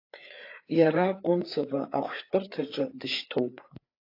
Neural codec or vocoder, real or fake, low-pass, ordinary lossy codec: codec, 16 kHz, 4 kbps, FreqCodec, larger model; fake; 5.4 kHz; AAC, 32 kbps